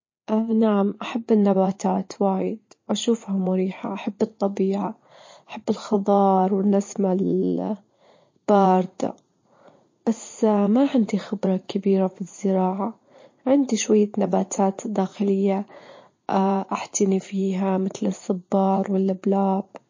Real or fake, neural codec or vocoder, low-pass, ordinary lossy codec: fake; vocoder, 44.1 kHz, 80 mel bands, Vocos; 7.2 kHz; MP3, 32 kbps